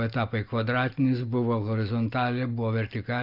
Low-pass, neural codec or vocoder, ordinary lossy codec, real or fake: 5.4 kHz; none; Opus, 32 kbps; real